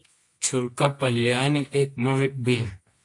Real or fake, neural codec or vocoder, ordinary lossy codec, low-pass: fake; codec, 24 kHz, 0.9 kbps, WavTokenizer, medium music audio release; AAC, 48 kbps; 10.8 kHz